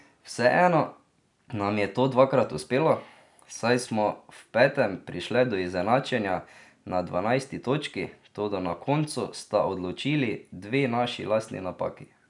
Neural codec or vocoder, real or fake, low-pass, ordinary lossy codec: none; real; 10.8 kHz; none